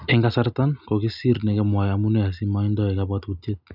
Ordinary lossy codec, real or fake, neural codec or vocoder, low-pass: none; real; none; 5.4 kHz